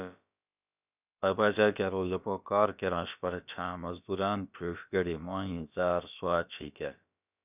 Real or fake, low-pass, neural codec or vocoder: fake; 3.6 kHz; codec, 16 kHz, about 1 kbps, DyCAST, with the encoder's durations